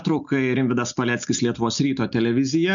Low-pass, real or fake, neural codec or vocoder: 7.2 kHz; real; none